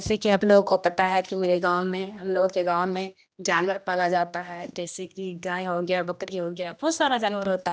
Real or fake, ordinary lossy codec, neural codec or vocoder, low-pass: fake; none; codec, 16 kHz, 1 kbps, X-Codec, HuBERT features, trained on general audio; none